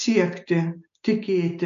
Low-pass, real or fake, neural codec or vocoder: 7.2 kHz; real; none